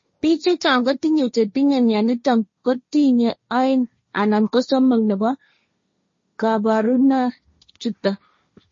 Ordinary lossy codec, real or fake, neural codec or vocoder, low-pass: MP3, 32 kbps; fake; codec, 16 kHz, 1.1 kbps, Voila-Tokenizer; 7.2 kHz